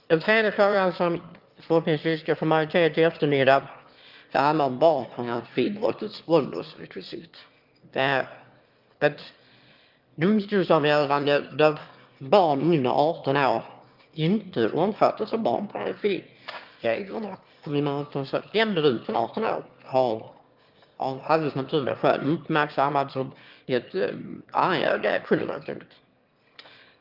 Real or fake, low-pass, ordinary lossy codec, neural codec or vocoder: fake; 5.4 kHz; Opus, 32 kbps; autoencoder, 22.05 kHz, a latent of 192 numbers a frame, VITS, trained on one speaker